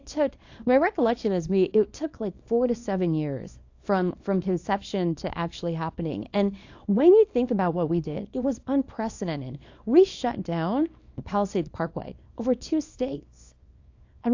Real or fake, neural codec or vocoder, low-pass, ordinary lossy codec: fake; codec, 24 kHz, 0.9 kbps, WavTokenizer, small release; 7.2 kHz; AAC, 48 kbps